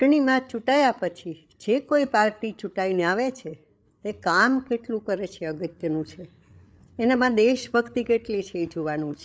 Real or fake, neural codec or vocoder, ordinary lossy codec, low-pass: fake; codec, 16 kHz, 8 kbps, FreqCodec, larger model; none; none